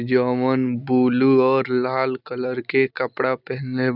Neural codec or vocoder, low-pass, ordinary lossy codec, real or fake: none; 5.4 kHz; none; real